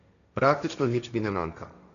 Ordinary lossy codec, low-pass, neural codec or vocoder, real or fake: none; 7.2 kHz; codec, 16 kHz, 1.1 kbps, Voila-Tokenizer; fake